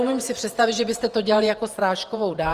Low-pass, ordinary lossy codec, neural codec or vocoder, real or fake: 14.4 kHz; Opus, 24 kbps; vocoder, 48 kHz, 128 mel bands, Vocos; fake